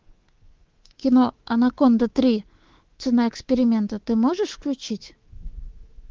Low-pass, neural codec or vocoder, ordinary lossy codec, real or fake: 7.2 kHz; codec, 24 kHz, 3.1 kbps, DualCodec; Opus, 16 kbps; fake